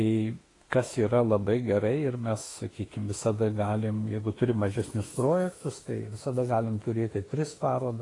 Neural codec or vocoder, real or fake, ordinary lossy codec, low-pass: autoencoder, 48 kHz, 32 numbers a frame, DAC-VAE, trained on Japanese speech; fake; AAC, 32 kbps; 10.8 kHz